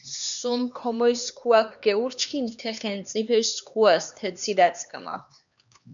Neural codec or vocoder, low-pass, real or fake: codec, 16 kHz, 2 kbps, X-Codec, HuBERT features, trained on LibriSpeech; 7.2 kHz; fake